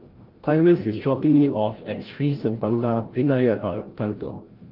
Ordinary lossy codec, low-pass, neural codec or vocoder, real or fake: Opus, 16 kbps; 5.4 kHz; codec, 16 kHz, 0.5 kbps, FreqCodec, larger model; fake